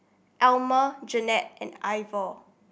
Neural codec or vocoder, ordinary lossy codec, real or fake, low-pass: none; none; real; none